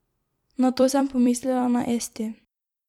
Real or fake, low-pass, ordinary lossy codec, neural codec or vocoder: fake; 19.8 kHz; none; vocoder, 44.1 kHz, 128 mel bands every 256 samples, BigVGAN v2